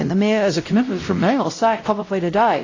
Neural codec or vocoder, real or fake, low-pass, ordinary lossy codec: codec, 16 kHz, 0.5 kbps, X-Codec, WavLM features, trained on Multilingual LibriSpeech; fake; 7.2 kHz; AAC, 32 kbps